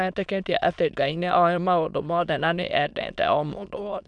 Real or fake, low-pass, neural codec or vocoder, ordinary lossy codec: fake; 9.9 kHz; autoencoder, 22.05 kHz, a latent of 192 numbers a frame, VITS, trained on many speakers; none